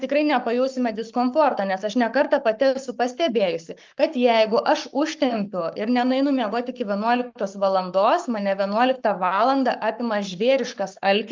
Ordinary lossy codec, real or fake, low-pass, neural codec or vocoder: Opus, 32 kbps; fake; 7.2 kHz; codec, 44.1 kHz, 7.8 kbps, Pupu-Codec